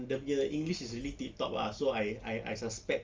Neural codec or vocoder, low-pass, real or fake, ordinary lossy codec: none; 7.2 kHz; real; Opus, 32 kbps